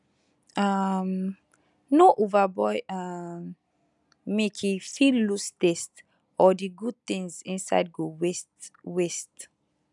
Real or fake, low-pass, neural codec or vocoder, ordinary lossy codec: real; 10.8 kHz; none; none